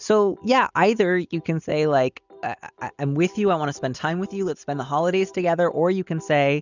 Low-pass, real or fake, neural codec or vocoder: 7.2 kHz; real; none